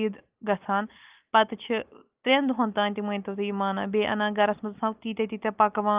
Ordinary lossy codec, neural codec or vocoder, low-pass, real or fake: Opus, 24 kbps; none; 3.6 kHz; real